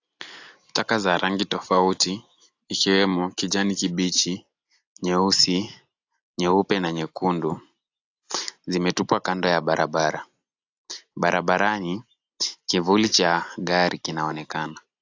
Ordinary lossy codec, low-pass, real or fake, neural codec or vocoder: AAC, 48 kbps; 7.2 kHz; real; none